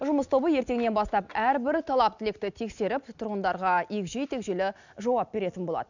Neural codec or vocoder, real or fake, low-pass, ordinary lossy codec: none; real; 7.2 kHz; none